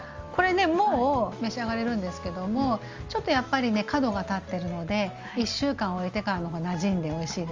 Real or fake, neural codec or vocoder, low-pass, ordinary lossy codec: real; none; 7.2 kHz; Opus, 32 kbps